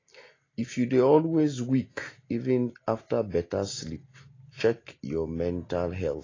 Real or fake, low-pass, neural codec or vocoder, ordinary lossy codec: real; 7.2 kHz; none; AAC, 32 kbps